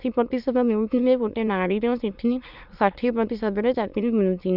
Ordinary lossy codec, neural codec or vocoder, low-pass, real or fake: none; autoencoder, 22.05 kHz, a latent of 192 numbers a frame, VITS, trained on many speakers; 5.4 kHz; fake